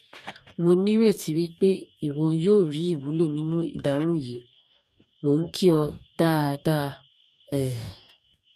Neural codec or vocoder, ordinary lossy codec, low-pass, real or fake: codec, 44.1 kHz, 2.6 kbps, DAC; none; 14.4 kHz; fake